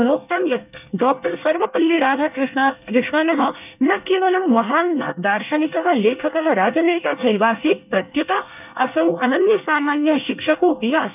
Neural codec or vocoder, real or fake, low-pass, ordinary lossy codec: codec, 24 kHz, 1 kbps, SNAC; fake; 3.6 kHz; none